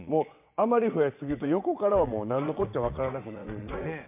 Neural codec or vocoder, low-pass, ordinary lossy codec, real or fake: vocoder, 22.05 kHz, 80 mel bands, WaveNeXt; 3.6 kHz; MP3, 32 kbps; fake